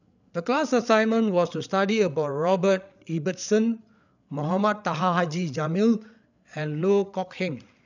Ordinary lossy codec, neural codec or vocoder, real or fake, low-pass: none; codec, 16 kHz, 8 kbps, FreqCodec, larger model; fake; 7.2 kHz